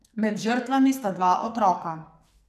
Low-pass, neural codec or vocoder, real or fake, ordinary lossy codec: 14.4 kHz; codec, 44.1 kHz, 2.6 kbps, SNAC; fake; none